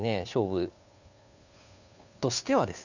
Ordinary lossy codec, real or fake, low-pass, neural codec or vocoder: none; fake; 7.2 kHz; codec, 16 kHz, 2 kbps, FunCodec, trained on Chinese and English, 25 frames a second